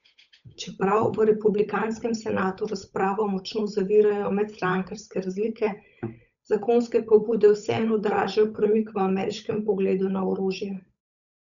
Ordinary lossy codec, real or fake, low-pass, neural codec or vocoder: none; fake; 7.2 kHz; codec, 16 kHz, 8 kbps, FunCodec, trained on Chinese and English, 25 frames a second